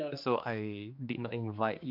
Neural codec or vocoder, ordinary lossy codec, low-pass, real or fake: codec, 16 kHz, 2 kbps, X-Codec, HuBERT features, trained on general audio; MP3, 48 kbps; 5.4 kHz; fake